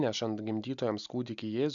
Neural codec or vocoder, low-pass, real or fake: none; 7.2 kHz; real